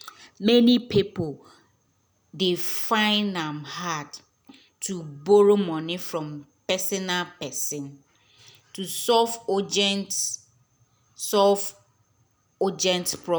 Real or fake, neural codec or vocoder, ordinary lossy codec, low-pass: real; none; none; none